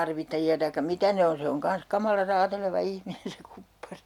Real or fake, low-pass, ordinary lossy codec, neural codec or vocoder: real; 19.8 kHz; none; none